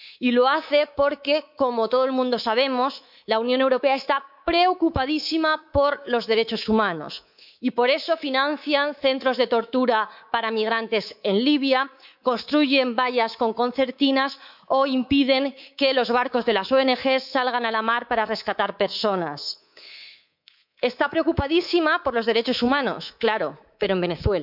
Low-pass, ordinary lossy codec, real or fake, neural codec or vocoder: 5.4 kHz; none; fake; codec, 24 kHz, 3.1 kbps, DualCodec